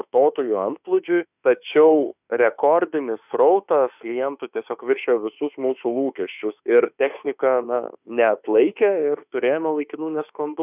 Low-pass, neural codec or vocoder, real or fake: 3.6 kHz; autoencoder, 48 kHz, 32 numbers a frame, DAC-VAE, trained on Japanese speech; fake